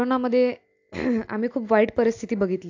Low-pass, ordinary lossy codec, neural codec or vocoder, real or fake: 7.2 kHz; AAC, 48 kbps; none; real